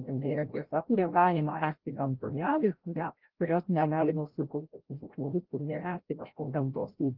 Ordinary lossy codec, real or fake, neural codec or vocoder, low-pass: Opus, 16 kbps; fake; codec, 16 kHz, 0.5 kbps, FreqCodec, larger model; 5.4 kHz